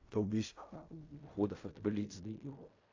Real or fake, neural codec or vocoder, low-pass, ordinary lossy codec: fake; codec, 16 kHz in and 24 kHz out, 0.4 kbps, LongCat-Audio-Codec, fine tuned four codebook decoder; 7.2 kHz; AAC, 48 kbps